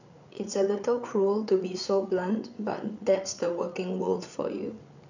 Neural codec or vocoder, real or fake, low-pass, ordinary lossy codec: codec, 16 kHz, 8 kbps, FreqCodec, larger model; fake; 7.2 kHz; none